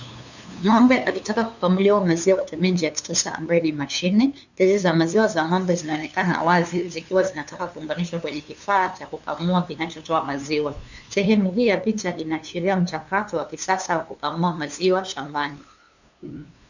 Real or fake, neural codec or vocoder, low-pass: fake; codec, 16 kHz, 2 kbps, FunCodec, trained on LibriTTS, 25 frames a second; 7.2 kHz